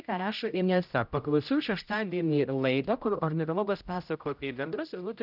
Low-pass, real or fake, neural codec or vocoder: 5.4 kHz; fake; codec, 16 kHz, 0.5 kbps, X-Codec, HuBERT features, trained on general audio